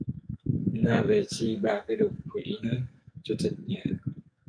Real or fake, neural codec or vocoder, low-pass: fake; codec, 44.1 kHz, 2.6 kbps, SNAC; 9.9 kHz